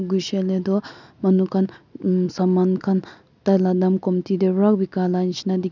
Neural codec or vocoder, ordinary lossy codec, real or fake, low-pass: none; none; real; 7.2 kHz